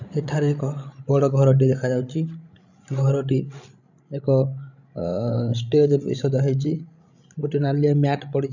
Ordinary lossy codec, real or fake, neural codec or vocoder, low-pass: none; fake; codec, 16 kHz, 16 kbps, FreqCodec, larger model; 7.2 kHz